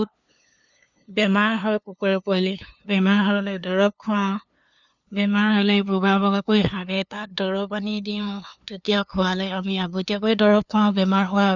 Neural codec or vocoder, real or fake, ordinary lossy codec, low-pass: codec, 16 kHz, 2 kbps, FunCodec, trained on LibriTTS, 25 frames a second; fake; none; 7.2 kHz